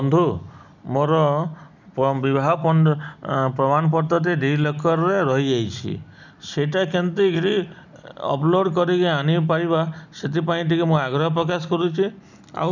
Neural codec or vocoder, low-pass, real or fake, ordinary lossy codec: none; 7.2 kHz; real; none